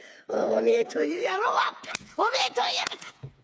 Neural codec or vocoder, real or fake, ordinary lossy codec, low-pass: codec, 16 kHz, 4 kbps, FreqCodec, smaller model; fake; none; none